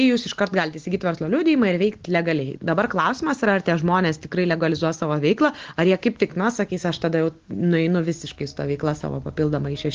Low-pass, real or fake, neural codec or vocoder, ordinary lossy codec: 7.2 kHz; real; none; Opus, 16 kbps